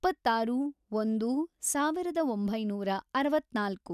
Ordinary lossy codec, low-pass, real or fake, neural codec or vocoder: none; 14.4 kHz; real; none